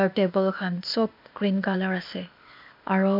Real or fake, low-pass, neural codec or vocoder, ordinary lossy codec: fake; 5.4 kHz; codec, 16 kHz, 0.8 kbps, ZipCodec; MP3, 48 kbps